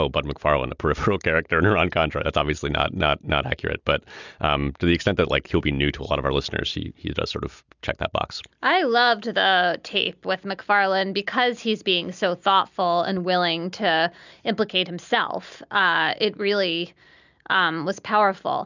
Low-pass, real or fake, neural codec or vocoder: 7.2 kHz; real; none